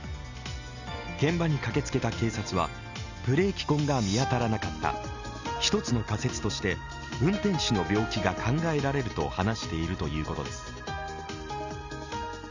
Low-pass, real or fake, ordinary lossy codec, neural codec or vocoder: 7.2 kHz; real; none; none